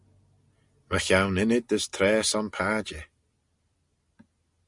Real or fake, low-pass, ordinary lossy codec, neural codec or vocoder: real; 10.8 kHz; Opus, 64 kbps; none